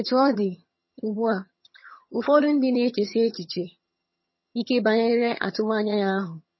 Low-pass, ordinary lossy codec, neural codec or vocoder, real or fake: 7.2 kHz; MP3, 24 kbps; vocoder, 22.05 kHz, 80 mel bands, HiFi-GAN; fake